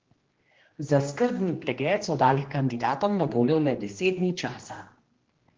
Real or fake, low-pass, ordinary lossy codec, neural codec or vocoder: fake; 7.2 kHz; Opus, 16 kbps; codec, 16 kHz, 1 kbps, X-Codec, HuBERT features, trained on general audio